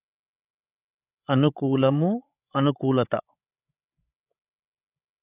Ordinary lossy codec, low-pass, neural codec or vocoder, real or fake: none; 3.6 kHz; codec, 16 kHz, 16 kbps, FreqCodec, larger model; fake